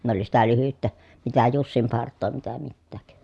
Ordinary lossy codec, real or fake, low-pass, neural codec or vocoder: none; real; none; none